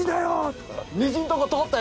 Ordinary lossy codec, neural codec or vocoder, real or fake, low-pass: none; none; real; none